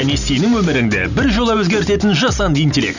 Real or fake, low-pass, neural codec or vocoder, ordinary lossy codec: real; 7.2 kHz; none; none